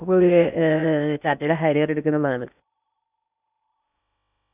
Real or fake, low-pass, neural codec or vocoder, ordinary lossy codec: fake; 3.6 kHz; codec, 16 kHz in and 24 kHz out, 0.6 kbps, FocalCodec, streaming, 2048 codes; none